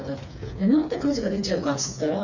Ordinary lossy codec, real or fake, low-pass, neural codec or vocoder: none; fake; 7.2 kHz; codec, 16 kHz, 4 kbps, FreqCodec, smaller model